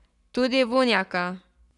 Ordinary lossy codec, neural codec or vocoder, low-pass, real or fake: none; codec, 44.1 kHz, 7.8 kbps, Pupu-Codec; 10.8 kHz; fake